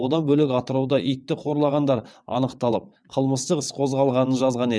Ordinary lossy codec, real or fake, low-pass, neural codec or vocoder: none; fake; none; vocoder, 22.05 kHz, 80 mel bands, WaveNeXt